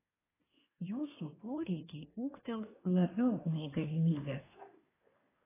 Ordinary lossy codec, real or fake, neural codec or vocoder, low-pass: AAC, 16 kbps; fake; codec, 24 kHz, 1 kbps, SNAC; 3.6 kHz